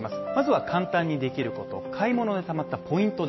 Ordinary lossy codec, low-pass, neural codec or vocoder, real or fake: MP3, 24 kbps; 7.2 kHz; none; real